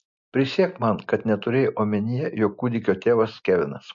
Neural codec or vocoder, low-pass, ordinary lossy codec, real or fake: none; 7.2 kHz; MP3, 48 kbps; real